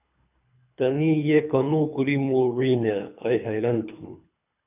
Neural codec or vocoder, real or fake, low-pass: codec, 24 kHz, 3 kbps, HILCodec; fake; 3.6 kHz